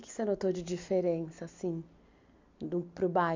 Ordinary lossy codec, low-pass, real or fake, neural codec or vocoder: MP3, 48 kbps; 7.2 kHz; real; none